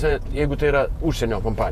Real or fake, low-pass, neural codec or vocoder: fake; 14.4 kHz; vocoder, 48 kHz, 128 mel bands, Vocos